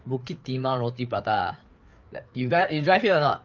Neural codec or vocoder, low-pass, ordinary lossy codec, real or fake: codec, 16 kHz, 4 kbps, FreqCodec, larger model; 7.2 kHz; Opus, 24 kbps; fake